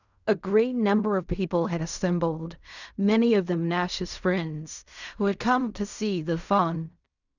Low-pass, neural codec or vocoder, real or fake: 7.2 kHz; codec, 16 kHz in and 24 kHz out, 0.4 kbps, LongCat-Audio-Codec, fine tuned four codebook decoder; fake